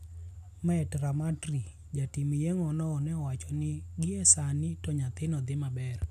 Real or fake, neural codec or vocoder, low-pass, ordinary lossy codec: real; none; 14.4 kHz; none